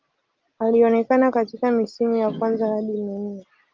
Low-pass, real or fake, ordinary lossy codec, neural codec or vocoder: 7.2 kHz; real; Opus, 24 kbps; none